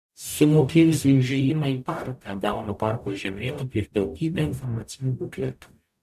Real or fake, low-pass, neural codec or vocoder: fake; 14.4 kHz; codec, 44.1 kHz, 0.9 kbps, DAC